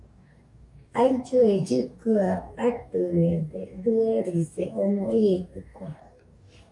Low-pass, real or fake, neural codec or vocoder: 10.8 kHz; fake; codec, 44.1 kHz, 2.6 kbps, DAC